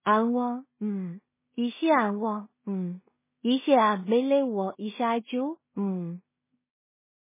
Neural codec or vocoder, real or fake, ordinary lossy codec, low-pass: codec, 16 kHz in and 24 kHz out, 0.4 kbps, LongCat-Audio-Codec, two codebook decoder; fake; MP3, 16 kbps; 3.6 kHz